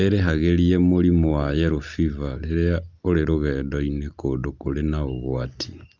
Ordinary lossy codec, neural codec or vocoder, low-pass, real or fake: Opus, 32 kbps; none; 7.2 kHz; real